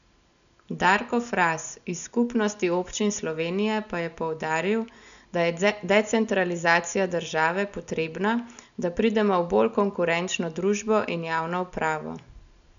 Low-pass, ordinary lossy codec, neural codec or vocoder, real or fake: 7.2 kHz; none; none; real